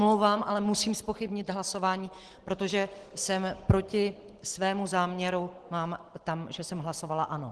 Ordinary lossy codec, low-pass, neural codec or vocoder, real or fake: Opus, 16 kbps; 10.8 kHz; none; real